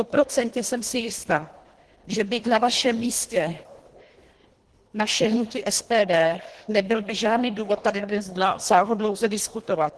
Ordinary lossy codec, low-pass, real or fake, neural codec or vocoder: Opus, 16 kbps; 10.8 kHz; fake; codec, 24 kHz, 1.5 kbps, HILCodec